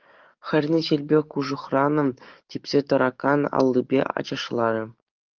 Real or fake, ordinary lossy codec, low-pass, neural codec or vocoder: fake; Opus, 32 kbps; 7.2 kHz; codec, 44.1 kHz, 7.8 kbps, DAC